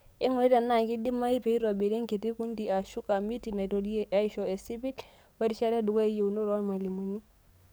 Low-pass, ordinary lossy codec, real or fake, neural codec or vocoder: none; none; fake; codec, 44.1 kHz, 7.8 kbps, DAC